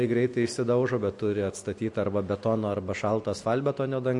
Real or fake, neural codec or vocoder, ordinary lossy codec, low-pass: real; none; MP3, 48 kbps; 10.8 kHz